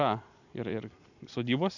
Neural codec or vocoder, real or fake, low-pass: none; real; 7.2 kHz